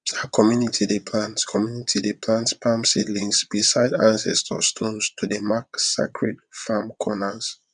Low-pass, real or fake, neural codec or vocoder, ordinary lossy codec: 9.9 kHz; fake; vocoder, 22.05 kHz, 80 mel bands, WaveNeXt; none